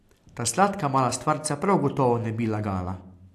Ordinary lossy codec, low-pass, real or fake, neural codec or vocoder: AAC, 64 kbps; 14.4 kHz; real; none